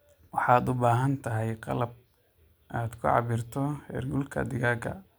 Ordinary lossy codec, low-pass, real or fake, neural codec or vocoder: none; none; real; none